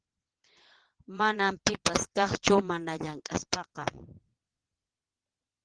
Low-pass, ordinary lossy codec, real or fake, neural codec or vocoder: 9.9 kHz; Opus, 16 kbps; fake; vocoder, 22.05 kHz, 80 mel bands, Vocos